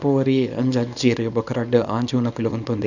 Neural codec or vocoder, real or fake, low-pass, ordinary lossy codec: codec, 24 kHz, 0.9 kbps, WavTokenizer, small release; fake; 7.2 kHz; none